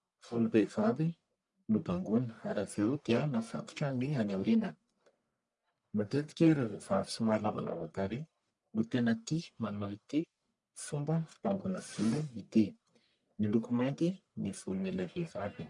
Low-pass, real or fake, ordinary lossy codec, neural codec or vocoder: 10.8 kHz; fake; MP3, 96 kbps; codec, 44.1 kHz, 1.7 kbps, Pupu-Codec